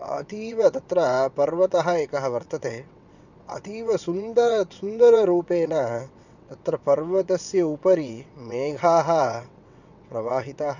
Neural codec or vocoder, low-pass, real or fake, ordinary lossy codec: vocoder, 22.05 kHz, 80 mel bands, WaveNeXt; 7.2 kHz; fake; none